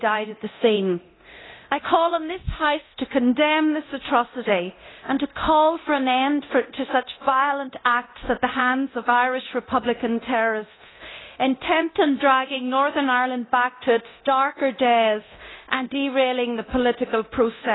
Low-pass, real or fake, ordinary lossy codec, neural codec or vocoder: 7.2 kHz; fake; AAC, 16 kbps; codec, 24 kHz, 0.9 kbps, DualCodec